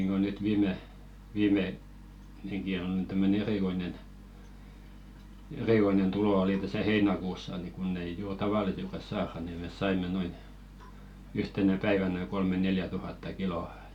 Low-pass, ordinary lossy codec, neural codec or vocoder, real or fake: 19.8 kHz; none; none; real